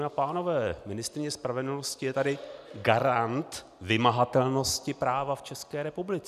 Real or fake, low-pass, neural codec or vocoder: real; 14.4 kHz; none